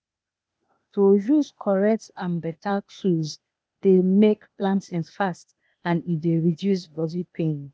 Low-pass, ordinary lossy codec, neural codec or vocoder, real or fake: none; none; codec, 16 kHz, 0.8 kbps, ZipCodec; fake